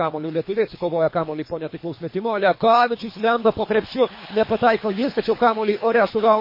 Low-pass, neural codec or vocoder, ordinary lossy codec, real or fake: 5.4 kHz; codec, 24 kHz, 3 kbps, HILCodec; MP3, 24 kbps; fake